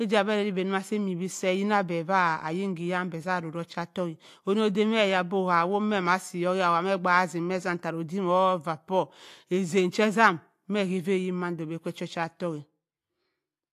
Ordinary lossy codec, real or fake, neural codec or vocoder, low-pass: AAC, 64 kbps; real; none; 14.4 kHz